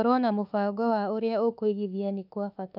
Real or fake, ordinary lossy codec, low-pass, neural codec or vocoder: fake; none; 5.4 kHz; autoencoder, 48 kHz, 32 numbers a frame, DAC-VAE, trained on Japanese speech